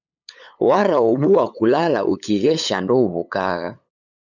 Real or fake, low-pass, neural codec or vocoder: fake; 7.2 kHz; codec, 16 kHz, 8 kbps, FunCodec, trained on LibriTTS, 25 frames a second